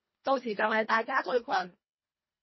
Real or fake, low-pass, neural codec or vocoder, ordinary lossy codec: fake; 7.2 kHz; codec, 24 kHz, 1.5 kbps, HILCodec; MP3, 24 kbps